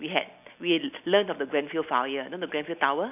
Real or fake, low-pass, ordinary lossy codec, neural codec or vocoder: real; 3.6 kHz; none; none